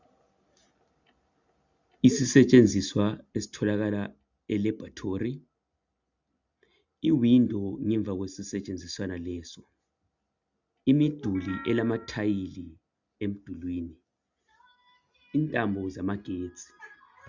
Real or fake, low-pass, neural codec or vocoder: real; 7.2 kHz; none